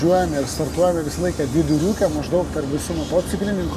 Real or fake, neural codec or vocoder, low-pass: real; none; 14.4 kHz